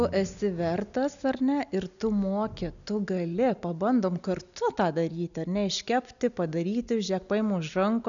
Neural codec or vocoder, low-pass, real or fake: none; 7.2 kHz; real